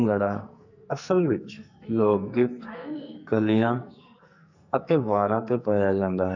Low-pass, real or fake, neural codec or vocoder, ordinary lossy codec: 7.2 kHz; fake; codec, 44.1 kHz, 2.6 kbps, SNAC; none